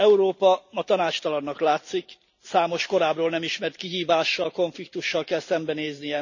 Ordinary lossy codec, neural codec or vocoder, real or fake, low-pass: none; none; real; 7.2 kHz